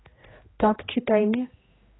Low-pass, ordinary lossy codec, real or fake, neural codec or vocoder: 7.2 kHz; AAC, 16 kbps; fake; codec, 16 kHz, 1 kbps, X-Codec, HuBERT features, trained on general audio